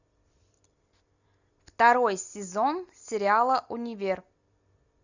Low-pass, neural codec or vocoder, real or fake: 7.2 kHz; none; real